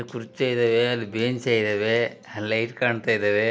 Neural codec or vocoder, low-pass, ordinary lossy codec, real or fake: none; none; none; real